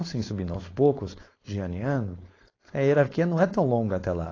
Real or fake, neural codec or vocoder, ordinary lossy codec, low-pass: fake; codec, 16 kHz, 4.8 kbps, FACodec; AAC, 32 kbps; 7.2 kHz